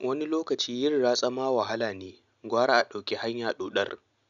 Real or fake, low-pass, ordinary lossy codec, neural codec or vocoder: real; 7.2 kHz; none; none